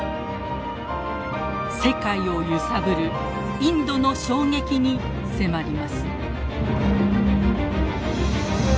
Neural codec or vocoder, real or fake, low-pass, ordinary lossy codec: none; real; none; none